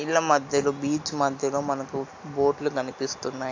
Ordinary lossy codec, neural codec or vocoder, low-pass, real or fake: AAC, 48 kbps; none; 7.2 kHz; real